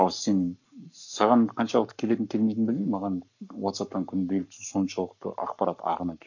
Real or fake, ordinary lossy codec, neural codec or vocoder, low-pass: fake; none; codec, 44.1 kHz, 7.8 kbps, Pupu-Codec; 7.2 kHz